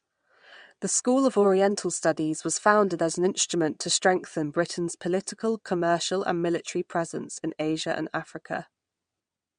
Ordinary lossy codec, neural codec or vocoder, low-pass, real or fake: MP3, 64 kbps; vocoder, 22.05 kHz, 80 mel bands, WaveNeXt; 9.9 kHz; fake